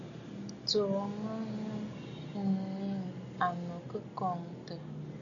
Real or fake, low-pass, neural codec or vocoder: real; 7.2 kHz; none